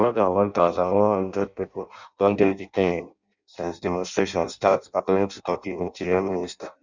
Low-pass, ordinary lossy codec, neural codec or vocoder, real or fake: 7.2 kHz; Opus, 64 kbps; codec, 16 kHz in and 24 kHz out, 0.6 kbps, FireRedTTS-2 codec; fake